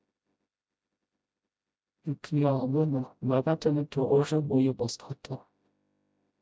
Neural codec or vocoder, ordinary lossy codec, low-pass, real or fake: codec, 16 kHz, 0.5 kbps, FreqCodec, smaller model; none; none; fake